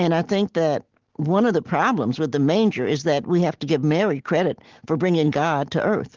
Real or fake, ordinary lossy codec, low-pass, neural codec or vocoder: real; Opus, 16 kbps; 7.2 kHz; none